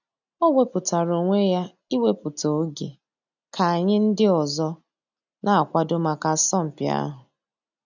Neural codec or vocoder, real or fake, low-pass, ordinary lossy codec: none; real; 7.2 kHz; none